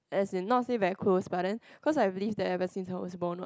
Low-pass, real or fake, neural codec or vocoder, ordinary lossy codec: none; real; none; none